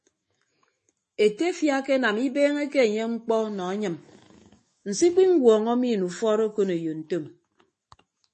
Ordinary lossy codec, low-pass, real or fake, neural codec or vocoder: MP3, 32 kbps; 10.8 kHz; fake; autoencoder, 48 kHz, 128 numbers a frame, DAC-VAE, trained on Japanese speech